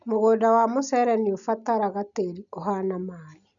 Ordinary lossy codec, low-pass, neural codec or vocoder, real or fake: none; 7.2 kHz; none; real